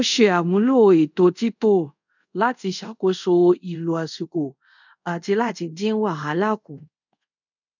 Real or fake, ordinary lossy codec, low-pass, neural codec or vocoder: fake; none; 7.2 kHz; codec, 24 kHz, 0.5 kbps, DualCodec